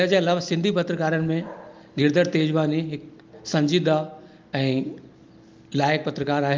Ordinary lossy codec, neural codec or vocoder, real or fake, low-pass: Opus, 24 kbps; none; real; 7.2 kHz